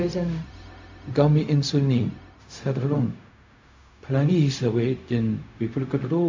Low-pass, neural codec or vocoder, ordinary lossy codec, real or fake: 7.2 kHz; codec, 16 kHz, 0.4 kbps, LongCat-Audio-Codec; MP3, 64 kbps; fake